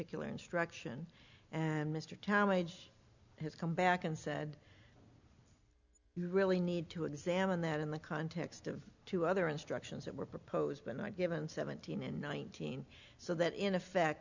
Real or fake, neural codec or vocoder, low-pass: real; none; 7.2 kHz